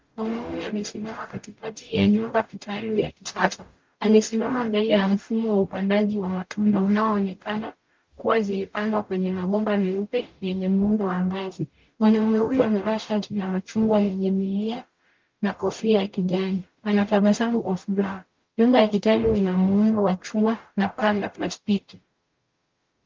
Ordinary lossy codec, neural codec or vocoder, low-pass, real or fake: Opus, 32 kbps; codec, 44.1 kHz, 0.9 kbps, DAC; 7.2 kHz; fake